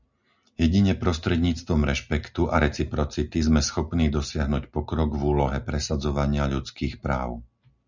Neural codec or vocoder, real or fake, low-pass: none; real; 7.2 kHz